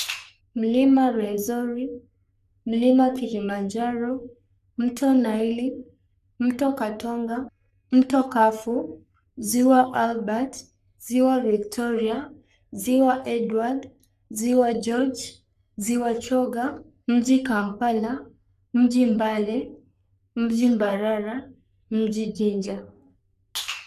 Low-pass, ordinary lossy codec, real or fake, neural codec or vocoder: 14.4 kHz; none; fake; codec, 44.1 kHz, 3.4 kbps, Pupu-Codec